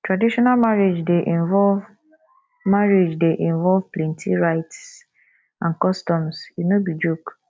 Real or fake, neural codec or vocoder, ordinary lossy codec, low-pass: real; none; none; none